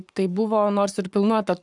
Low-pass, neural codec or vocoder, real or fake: 10.8 kHz; codec, 44.1 kHz, 7.8 kbps, Pupu-Codec; fake